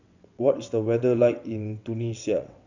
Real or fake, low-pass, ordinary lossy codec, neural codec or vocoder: fake; 7.2 kHz; none; vocoder, 22.05 kHz, 80 mel bands, WaveNeXt